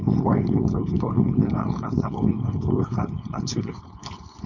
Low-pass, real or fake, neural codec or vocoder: 7.2 kHz; fake; codec, 16 kHz, 4.8 kbps, FACodec